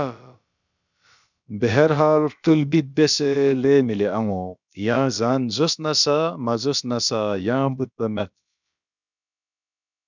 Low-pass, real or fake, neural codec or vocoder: 7.2 kHz; fake; codec, 16 kHz, about 1 kbps, DyCAST, with the encoder's durations